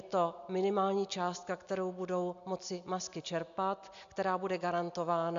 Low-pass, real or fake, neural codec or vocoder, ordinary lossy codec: 7.2 kHz; real; none; AAC, 64 kbps